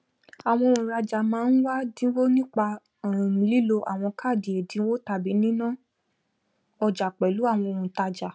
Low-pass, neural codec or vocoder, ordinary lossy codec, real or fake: none; none; none; real